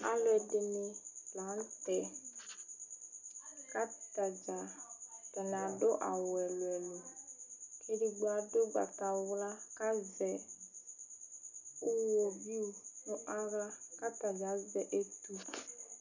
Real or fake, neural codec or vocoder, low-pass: real; none; 7.2 kHz